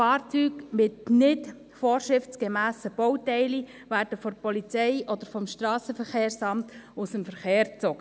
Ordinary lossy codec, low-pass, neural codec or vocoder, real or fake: none; none; none; real